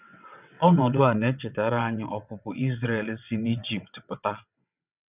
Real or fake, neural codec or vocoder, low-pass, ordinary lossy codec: fake; vocoder, 22.05 kHz, 80 mel bands, WaveNeXt; 3.6 kHz; none